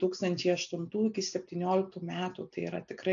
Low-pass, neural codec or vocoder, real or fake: 7.2 kHz; none; real